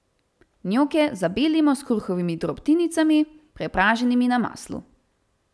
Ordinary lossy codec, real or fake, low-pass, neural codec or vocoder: none; real; none; none